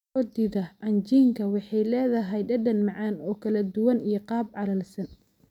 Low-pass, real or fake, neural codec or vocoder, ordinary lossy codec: 19.8 kHz; real; none; none